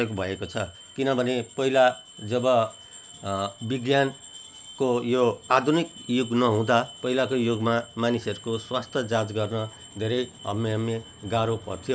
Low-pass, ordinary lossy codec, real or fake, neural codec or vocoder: none; none; real; none